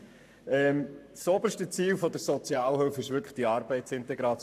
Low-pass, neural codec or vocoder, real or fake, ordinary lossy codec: 14.4 kHz; codec, 44.1 kHz, 7.8 kbps, Pupu-Codec; fake; none